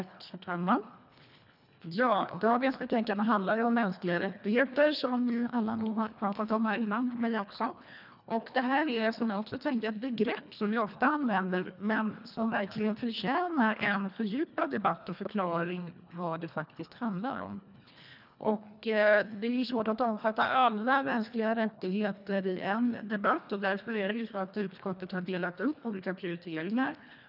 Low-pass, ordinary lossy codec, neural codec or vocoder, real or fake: 5.4 kHz; none; codec, 24 kHz, 1.5 kbps, HILCodec; fake